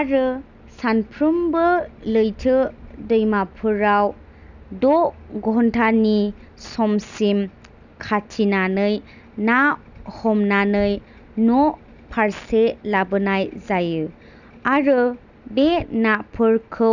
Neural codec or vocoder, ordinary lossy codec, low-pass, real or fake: none; none; 7.2 kHz; real